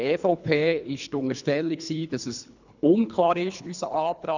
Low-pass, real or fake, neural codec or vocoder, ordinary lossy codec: 7.2 kHz; fake; codec, 24 kHz, 3 kbps, HILCodec; none